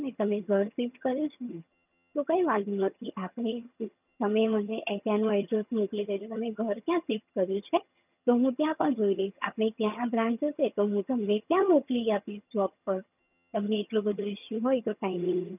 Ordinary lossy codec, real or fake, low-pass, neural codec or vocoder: none; fake; 3.6 kHz; vocoder, 22.05 kHz, 80 mel bands, HiFi-GAN